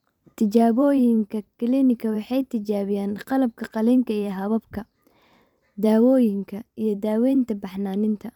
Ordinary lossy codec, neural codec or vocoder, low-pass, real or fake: none; vocoder, 44.1 kHz, 128 mel bands every 512 samples, BigVGAN v2; 19.8 kHz; fake